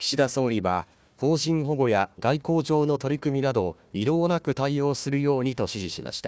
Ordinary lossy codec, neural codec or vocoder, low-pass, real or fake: none; codec, 16 kHz, 1 kbps, FunCodec, trained on Chinese and English, 50 frames a second; none; fake